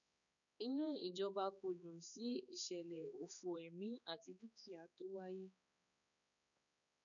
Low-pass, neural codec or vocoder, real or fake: 7.2 kHz; codec, 16 kHz, 2 kbps, X-Codec, HuBERT features, trained on balanced general audio; fake